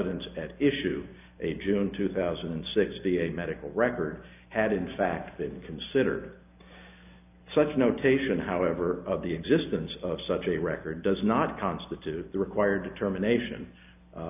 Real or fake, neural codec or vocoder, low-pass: real; none; 3.6 kHz